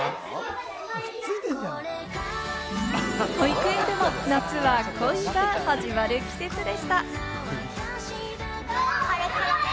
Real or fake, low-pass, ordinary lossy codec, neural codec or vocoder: real; none; none; none